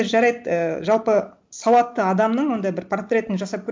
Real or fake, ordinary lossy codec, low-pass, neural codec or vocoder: real; none; none; none